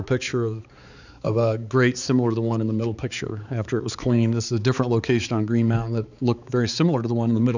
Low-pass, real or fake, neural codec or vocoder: 7.2 kHz; fake; codec, 16 kHz, 4 kbps, X-Codec, HuBERT features, trained on balanced general audio